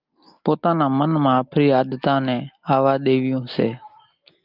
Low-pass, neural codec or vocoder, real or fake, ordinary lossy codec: 5.4 kHz; none; real; Opus, 32 kbps